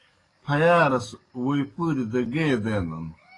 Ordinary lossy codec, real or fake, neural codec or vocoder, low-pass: AAC, 32 kbps; fake; codec, 44.1 kHz, 7.8 kbps, DAC; 10.8 kHz